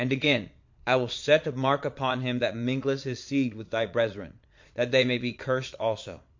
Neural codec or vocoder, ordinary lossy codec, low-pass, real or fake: vocoder, 22.05 kHz, 80 mel bands, Vocos; MP3, 48 kbps; 7.2 kHz; fake